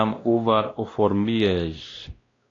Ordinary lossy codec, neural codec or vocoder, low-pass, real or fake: AAC, 32 kbps; codec, 16 kHz, 1 kbps, X-Codec, WavLM features, trained on Multilingual LibriSpeech; 7.2 kHz; fake